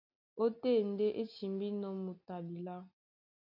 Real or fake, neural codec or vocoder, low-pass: real; none; 5.4 kHz